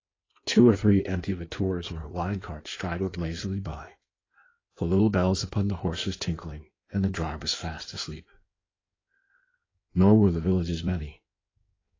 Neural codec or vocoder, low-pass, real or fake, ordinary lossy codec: codec, 16 kHz in and 24 kHz out, 1.1 kbps, FireRedTTS-2 codec; 7.2 kHz; fake; AAC, 32 kbps